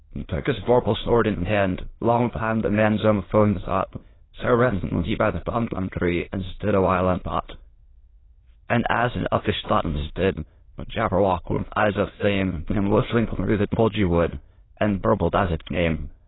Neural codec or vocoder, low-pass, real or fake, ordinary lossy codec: autoencoder, 22.05 kHz, a latent of 192 numbers a frame, VITS, trained on many speakers; 7.2 kHz; fake; AAC, 16 kbps